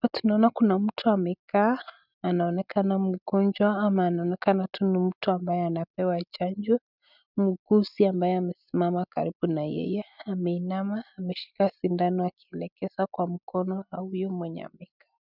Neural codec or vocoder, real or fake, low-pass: none; real; 5.4 kHz